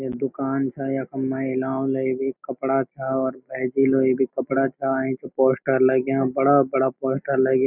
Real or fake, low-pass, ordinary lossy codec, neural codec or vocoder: real; 3.6 kHz; none; none